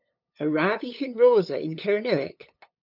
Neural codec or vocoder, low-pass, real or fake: codec, 16 kHz, 8 kbps, FunCodec, trained on LibriTTS, 25 frames a second; 5.4 kHz; fake